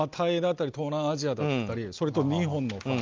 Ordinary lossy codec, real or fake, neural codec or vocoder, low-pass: Opus, 24 kbps; real; none; 7.2 kHz